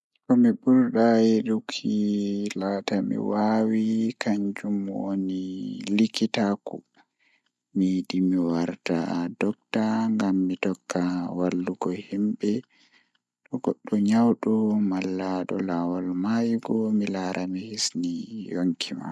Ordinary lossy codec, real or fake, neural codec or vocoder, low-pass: none; real; none; none